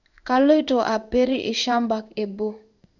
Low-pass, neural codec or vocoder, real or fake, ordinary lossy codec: 7.2 kHz; codec, 16 kHz in and 24 kHz out, 1 kbps, XY-Tokenizer; fake; none